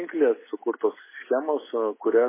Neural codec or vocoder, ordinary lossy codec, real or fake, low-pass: none; MP3, 16 kbps; real; 3.6 kHz